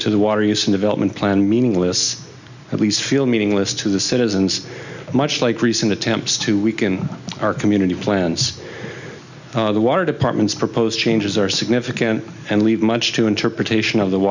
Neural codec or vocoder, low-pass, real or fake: none; 7.2 kHz; real